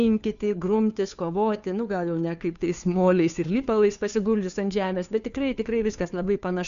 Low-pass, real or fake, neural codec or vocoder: 7.2 kHz; fake; codec, 16 kHz, 2 kbps, FunCodec, trained on Chinese and English, 25 frames a second